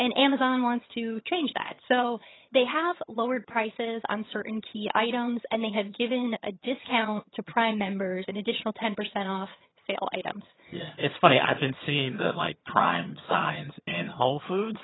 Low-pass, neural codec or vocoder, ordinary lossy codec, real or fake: 7.2 kHz; vocoder, 22.05 kHz, 80 mel bands, HiFi-GAN; AAC, 16 kbps; fake